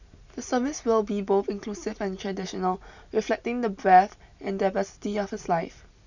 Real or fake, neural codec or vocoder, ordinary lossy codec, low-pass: real; none; none; 7.2 kHz